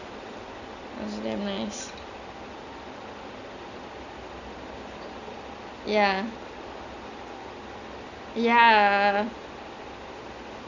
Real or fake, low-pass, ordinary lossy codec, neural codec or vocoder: real; 7.2 kHz; none; none